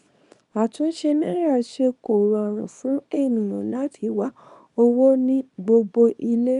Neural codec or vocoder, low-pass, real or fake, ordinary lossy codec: codec, 24 kHz, 0.9 kbps, WavTokenizer, small release; 10.8 kHz; fake; none